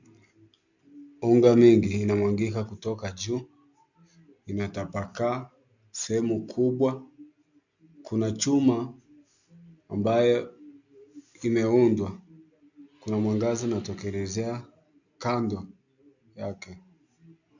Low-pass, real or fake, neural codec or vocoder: 7.2 kHz; real; none